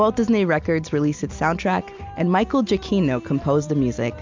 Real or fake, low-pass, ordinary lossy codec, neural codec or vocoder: real; 7.2 kHz; MP3, 64 kbps; none